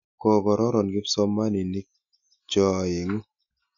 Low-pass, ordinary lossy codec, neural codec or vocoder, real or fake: 7.2 kHz; MP3, 96 kbps; none; real